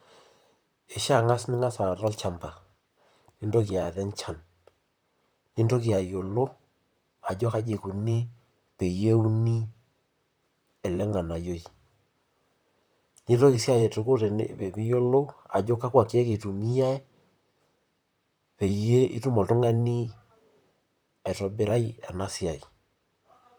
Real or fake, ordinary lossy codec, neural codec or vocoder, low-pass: fake; none; vocoder, 44.1 kHz, 128 mel bands, Pupu-Vocoder; none